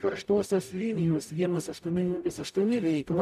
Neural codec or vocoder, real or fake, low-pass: codec, 44.1 kHz, 0.9 kbps, DAC; fake; 14.4 kHz